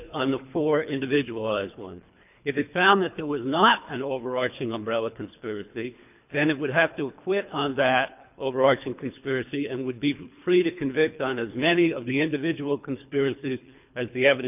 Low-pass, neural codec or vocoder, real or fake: 3.6 kHz; codec, 24 kHz, 3 kbps, HILCodec; fake